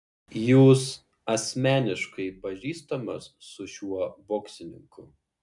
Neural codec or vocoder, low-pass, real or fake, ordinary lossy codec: none; 10.8 kHz; real; MP3, 96 kbps